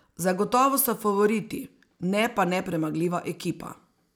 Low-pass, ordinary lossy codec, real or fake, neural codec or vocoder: none; none; real; none